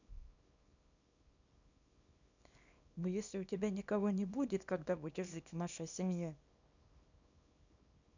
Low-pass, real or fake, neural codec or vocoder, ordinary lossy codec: 7.2 kHz; fake; codec, 24 kHz, 0.9 kbps, WavTokenizer, small release; none